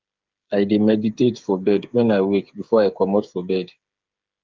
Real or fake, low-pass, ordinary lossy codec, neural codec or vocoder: fake; 7.2 kHz; Opus, 32 kbps; codec, 16 kHz, 8 kbps, FreqCodec, smaller model